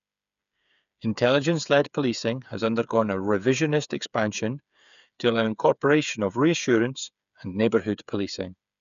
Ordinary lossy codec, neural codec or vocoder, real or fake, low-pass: none; codec, 16 kHz, 8 kbps, FreqCodec, smaller model; fake; 7.2 kHz